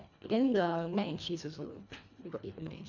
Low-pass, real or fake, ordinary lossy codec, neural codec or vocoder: 7.2 kHz; fake; none; codec, 24 kHz, 1.5 kbps, HILCodec